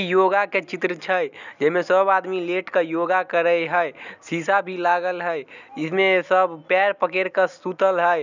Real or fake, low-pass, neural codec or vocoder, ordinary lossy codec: real; 7.2 kHz; none; none